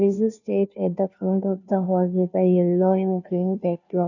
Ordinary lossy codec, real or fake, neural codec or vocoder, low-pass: none; fake; codec, 16 kHz, 0.5 kbps, FunCodec, trained on Chinese and English, 25 frames a second; 7.2 kHz